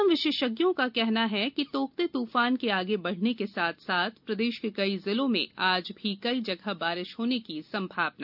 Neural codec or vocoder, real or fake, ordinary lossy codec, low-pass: none; real; none; 5.4 kHz